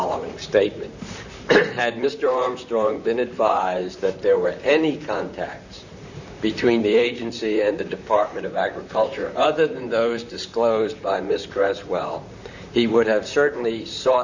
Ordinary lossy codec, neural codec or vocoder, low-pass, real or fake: Opus, 64 kbps; vocoder, 44.1 kHz, 128 mel bands, Pupu-Vocoder; 7.2 kHz; fake